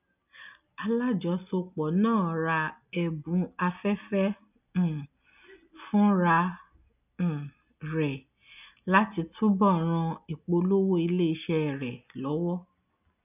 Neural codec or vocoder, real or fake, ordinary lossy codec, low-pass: none; real; none; 3.6 kHz